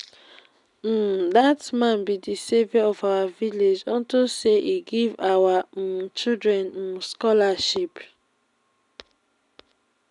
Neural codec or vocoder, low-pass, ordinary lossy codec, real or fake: none; 10.8 kHz; none; real